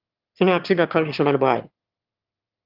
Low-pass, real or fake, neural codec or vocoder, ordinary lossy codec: 5.4 kHz; fake; autoencoder, 22.05 kHz, a latent of 192 numbers a frame, VITS, trained on one speaker; Opus, 24 kbps